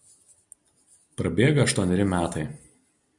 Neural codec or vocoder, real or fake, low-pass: none; real; 10.8 kHz